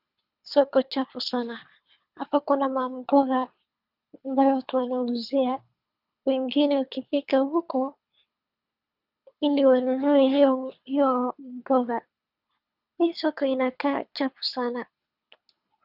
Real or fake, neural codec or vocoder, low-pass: fake; codec, 24 kHz, 3 kbps, HILCodec; 5.4 kHz